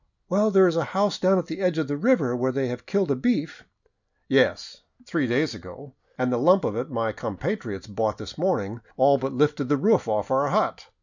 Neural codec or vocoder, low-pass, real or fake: none; 7.2 kHz; real